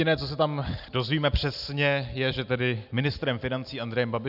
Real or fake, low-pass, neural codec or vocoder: real; 5.4 kHz; none